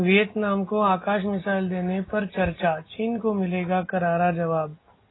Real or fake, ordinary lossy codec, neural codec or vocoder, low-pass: real; AAC, 16 kbps; none; 7.2 kHz